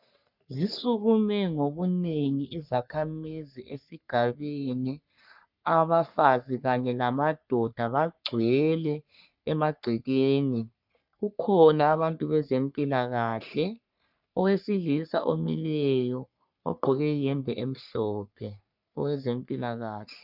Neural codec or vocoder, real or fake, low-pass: codec, 44.1 kHz, 3.4 kbps, Pupu-Codec; fake; 5.4 kHz